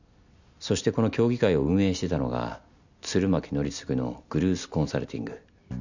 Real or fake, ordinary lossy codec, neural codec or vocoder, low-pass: real; none; none; 7.2 kHz